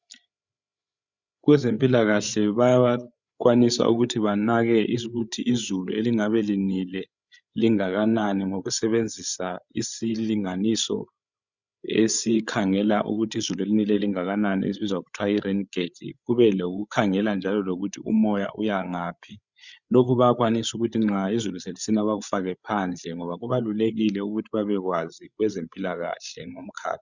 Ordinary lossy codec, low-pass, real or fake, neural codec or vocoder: Opus, 64 kbps; 7.2 kHz; fake; codec, 16 kHz, 8 kbps, FreqCodec, larger model